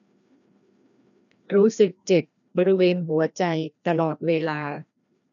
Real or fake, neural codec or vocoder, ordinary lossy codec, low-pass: fake; codec, 16 kHz, 1 kbps, FreqCodec, larger model; none; 7.2 kHz